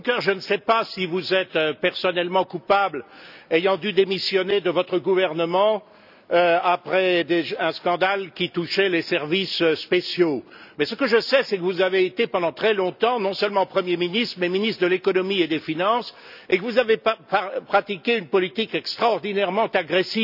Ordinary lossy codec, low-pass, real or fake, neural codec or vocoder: none; 5.4 kHz; real; none